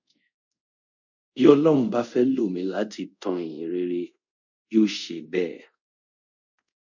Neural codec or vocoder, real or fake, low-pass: codec, 24 kHz, 0.5 kbps, DualCodec; fake; 7.2 kHz